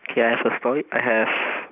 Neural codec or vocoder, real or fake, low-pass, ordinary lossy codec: none; real; 3.6 kHz; none